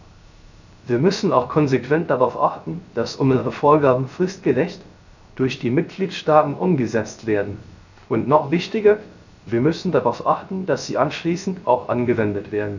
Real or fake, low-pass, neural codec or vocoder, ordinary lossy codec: fake; 7.2 kHz; codec, 16 kHz, 0.3 kbps, FocalCodec; none